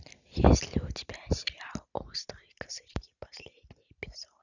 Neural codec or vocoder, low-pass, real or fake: none; 7.2 kHz; real